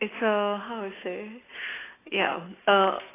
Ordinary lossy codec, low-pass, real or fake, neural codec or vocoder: AAC, 16 kbps; 3.6 kHz; real; none